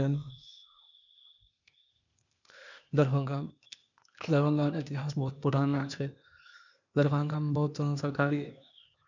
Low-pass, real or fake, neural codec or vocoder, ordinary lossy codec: 7.2 kHz; fake; codec, 16 kHz, 0.8 kbps, ZipCodec; none